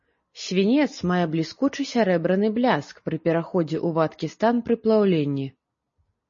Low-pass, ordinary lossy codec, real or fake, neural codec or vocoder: 7.2 kHz; MP3, 32 kbps; real; none